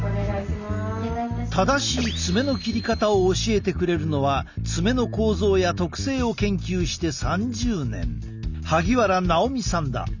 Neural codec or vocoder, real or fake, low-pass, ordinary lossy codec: none; real; 7.2 kHz; none